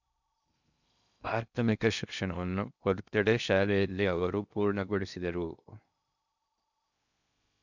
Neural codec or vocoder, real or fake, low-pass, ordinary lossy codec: codec, 16 kHz in and 24 kHz out, 0.6 kbps, FocalCodec, streaming, 2048 codes; fake; 7.2 kHz; none